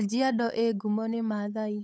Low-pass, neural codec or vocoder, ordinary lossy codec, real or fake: none; codec, 16 kHz, 8 kbps, FunCodec, trained on Chinese and English, 25 frames a second; none; fake